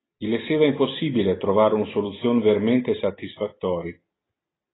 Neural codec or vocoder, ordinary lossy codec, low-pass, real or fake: none; AAC, 16 kbps; 7.2 kHz; real